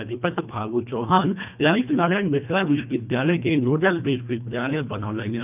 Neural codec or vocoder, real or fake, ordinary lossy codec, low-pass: codec, 24 kHz, 1.5 kbps, HILCodec; fake; none; 3.6 kHz